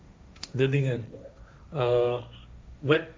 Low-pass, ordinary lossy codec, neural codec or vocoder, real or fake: none; none; codec, 16 kHz, 1.1 kbps, Voila-Tokenizer; fake